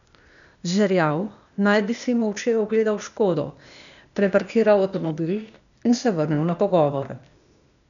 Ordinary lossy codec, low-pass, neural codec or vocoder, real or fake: none; 7.2 kHz; codec, 16 kHz, 0.8 kbps, ZipCodec; fake